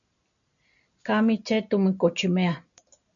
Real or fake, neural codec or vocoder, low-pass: real; none; 7.2 kHz